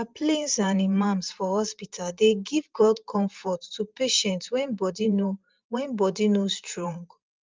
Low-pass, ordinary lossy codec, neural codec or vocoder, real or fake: 7.2 kHz; Opus, 24 kbps; vocoder, 44.1 kHz, 128 mel bands every 512 samples, BigVGAN v2; fake